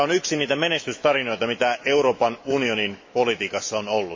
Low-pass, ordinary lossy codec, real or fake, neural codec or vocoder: 7.2 kHz; MP3, 32 kbps; fake; autoencoder, 48 kHz, 128 numbers a frame, DAC-VAE, trained on Japanese speech